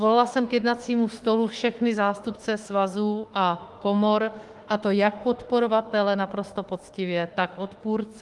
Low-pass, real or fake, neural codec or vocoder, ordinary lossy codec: 10.8 kHz; fake; autoencoder, 48 kHz, 32 numbers a frame, DAC-VAE, trained on Japanese speech; Opus, 24 kbps